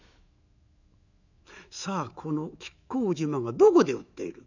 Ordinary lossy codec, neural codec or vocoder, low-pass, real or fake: none; autoencoder, 48 kHz, 128 numbers a frame, DAC-VAE, trained on Japanese speech; 7.2 kHz; fake